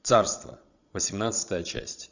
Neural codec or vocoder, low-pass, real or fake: vocoder, 22.05 kHz, 80 mel bands, Vocos; 7.2 kHz; fake